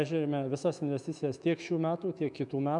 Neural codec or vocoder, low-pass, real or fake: autoencoder, 48 kHz, 128 numbers a frame, DAC-VAE, trained on Japanese speech; 10.8 kHz; fake